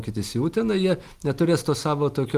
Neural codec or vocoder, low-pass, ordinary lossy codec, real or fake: none; 14.4 kHz; Opus, 24 kbps; real